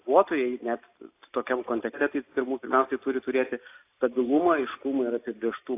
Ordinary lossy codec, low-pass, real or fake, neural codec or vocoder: AAC, 24 kbps; 3.6 kHz; real; none